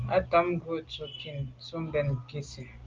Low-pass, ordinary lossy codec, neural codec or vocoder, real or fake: 7.2 kHz; Opus, 32 kbps; none; real